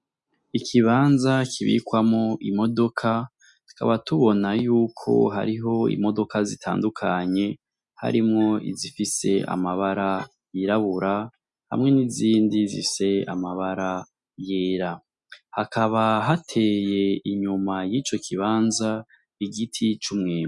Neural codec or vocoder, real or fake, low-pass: none; real; 10.8 kHz